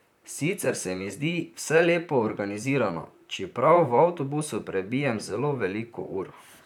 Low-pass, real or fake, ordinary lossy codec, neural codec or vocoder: 19.8 kHz; fake; none; vocoder, 44.1 kHz, 128 mel bands, Pupu-Vocoder